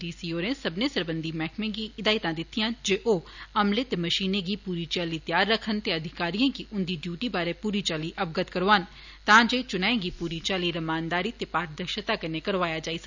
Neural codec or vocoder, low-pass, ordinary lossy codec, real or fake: none; 7.2 kHz; none; real